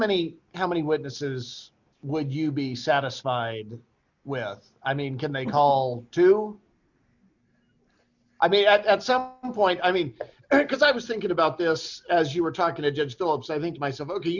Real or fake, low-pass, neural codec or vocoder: real; 7.2 kHz; none